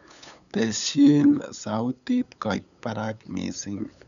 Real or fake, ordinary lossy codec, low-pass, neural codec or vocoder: fake; none; 7.2 kHz; codec, 16 kHz, 8 kbps, FunCodec, trained on LibriTTS, 25 frames a second